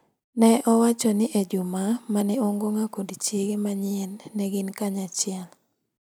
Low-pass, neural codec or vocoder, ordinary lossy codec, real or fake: none; none; none; real